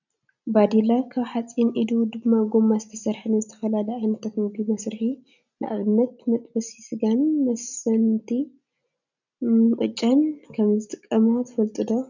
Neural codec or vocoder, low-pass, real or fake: none; 7.2 kHz; real